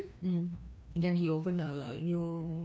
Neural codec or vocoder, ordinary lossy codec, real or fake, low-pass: codec, 16 kHz, 1 kbps, FreqCodec, larger model; none; fake; none